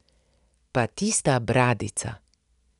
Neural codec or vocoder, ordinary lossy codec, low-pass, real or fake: none; none; 10.8 kHz; real